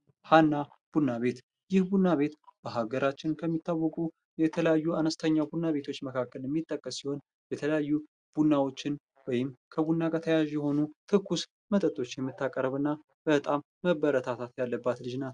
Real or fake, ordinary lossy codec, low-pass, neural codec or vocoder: real; Opus, 64 kbps; 9.9 kHz; none